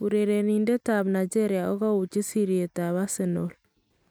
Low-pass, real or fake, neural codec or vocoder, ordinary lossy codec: none; real; none; none